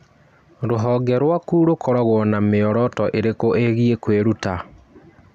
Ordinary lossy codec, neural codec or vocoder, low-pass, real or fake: none; none; 14.4 kHz; real